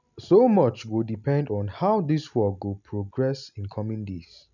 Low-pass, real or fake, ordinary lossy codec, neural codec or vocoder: 7.2 kHz; real; none; none